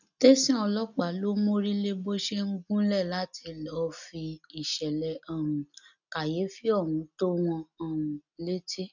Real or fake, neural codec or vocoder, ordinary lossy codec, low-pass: real; none; none; 7.2 kHz